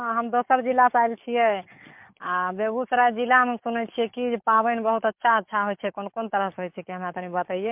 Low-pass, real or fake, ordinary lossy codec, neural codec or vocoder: 3.6 kHz; fake; MP3, 32 kbps; codec, 44.1 kHz, 7.8 kbps, DAC